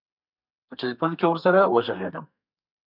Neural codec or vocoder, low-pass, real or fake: codec, 32 kHz, 1.9 kbps, SNAC; 5.4 kHz; fake